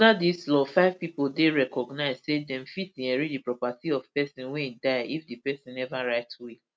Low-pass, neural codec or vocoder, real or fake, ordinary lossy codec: none; none; real; none